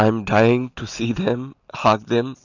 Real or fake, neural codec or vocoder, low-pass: real; none; 7.2 kHz